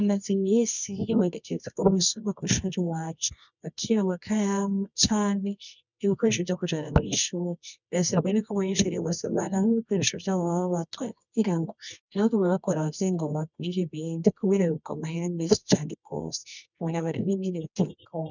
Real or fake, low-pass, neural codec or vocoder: fake; 7.2 kHz; codec, 24 kHz, 0.9 kbps, WavTokenizer, medium music audio release